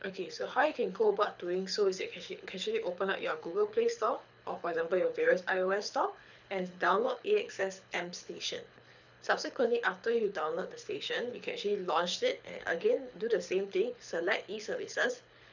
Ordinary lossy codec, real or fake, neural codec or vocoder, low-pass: none; fake; codec, 24 kHz, 6 kbps, HILCodec; 7.2 kHz